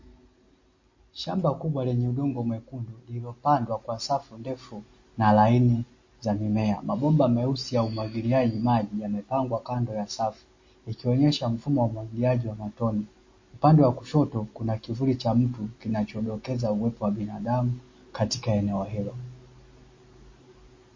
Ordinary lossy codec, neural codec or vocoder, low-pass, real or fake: MP3, 32 kbps; none; 7.2 kHz; real